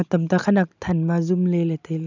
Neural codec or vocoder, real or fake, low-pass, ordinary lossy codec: none; real; 7.2 kHz; none